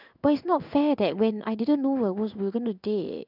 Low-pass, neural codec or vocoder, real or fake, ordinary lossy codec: 5.4 kHz; codec, 16 kHz in and 24 kHz out, 1 kbps, XY-Tokenizer; fake; none